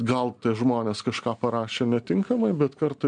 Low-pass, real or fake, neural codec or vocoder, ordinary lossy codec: 9.9 kHz; real; none; MP3, 96 kbps